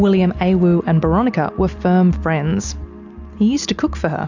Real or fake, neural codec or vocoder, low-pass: real; none; 7.2 kHz